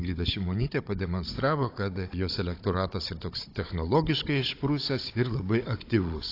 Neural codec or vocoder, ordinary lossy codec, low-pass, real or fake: codec, 16 kHz, 16 kbps, FunCodec, trained on Chinese and English, 50 frames a second; AAC, 32 kbps; 5.4 kHz; fake